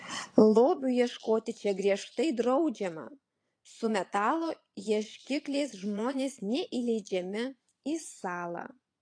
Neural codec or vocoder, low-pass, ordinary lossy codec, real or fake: vocoder, 22.05 kHz, 80 mel bands, Vocos; 9.9 kHz; AAC, 48 kbps; fake